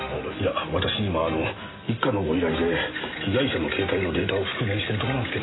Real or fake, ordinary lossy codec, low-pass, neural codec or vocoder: real; AAC, 16 kbps; 7.2 kHz; none